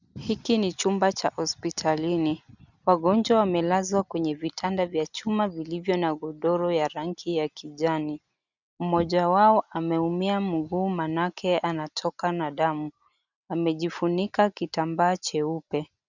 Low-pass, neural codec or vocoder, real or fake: 7.2 kHz; none; real